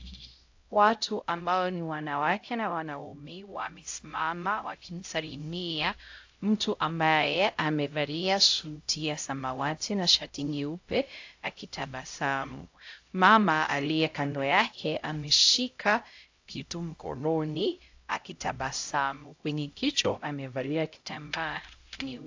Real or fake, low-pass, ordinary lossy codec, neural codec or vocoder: fake; 7.2 kHz; AAC, 48 kbps; codec, 16 kHz, 0.5 kbps, X-Codec, HuBERT features, trained on LibriSpeech